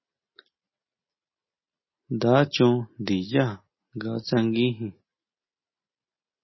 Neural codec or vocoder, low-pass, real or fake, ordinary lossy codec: none; 7.2 kHz; real; MP3, 24 kbps